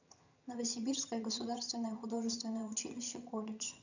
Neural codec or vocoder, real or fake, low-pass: vocoder, 22.05 kHz, 80 mel bands, HiFi-GAN; fake; 7.2 kHz